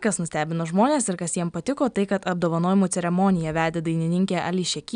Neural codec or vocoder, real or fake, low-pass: none; real; 9.9 kHz